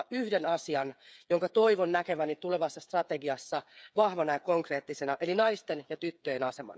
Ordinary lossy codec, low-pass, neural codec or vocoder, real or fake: none; none; codec, 16 kHz, 8 kbps, FreqCodec, smaller model; fake